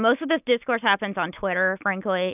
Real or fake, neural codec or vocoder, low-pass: real; none; 3.6 kHz